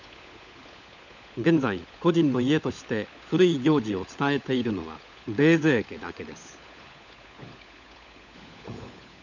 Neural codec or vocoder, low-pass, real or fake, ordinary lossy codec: codec, 16 kHz, 16 kbps, FunCodec, trained on LibriTTS, 50 frames a second; 7.2 kHz; fake; none